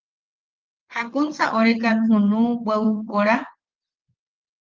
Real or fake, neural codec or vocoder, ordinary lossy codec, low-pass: fake; vocoder, 22.05 kHz, 80 mel bands, WaveNeXt; Opus, 32 kbps; 7.2 kHz